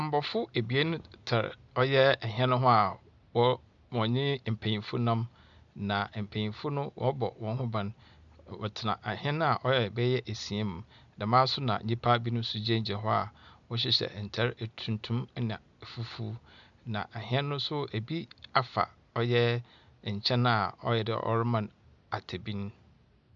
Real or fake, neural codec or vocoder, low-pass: real; none; 7.2 kHz